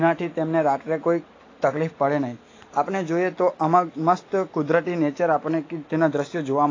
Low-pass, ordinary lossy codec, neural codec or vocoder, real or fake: 7.2 kHz; AAC, 32 kbps; none; real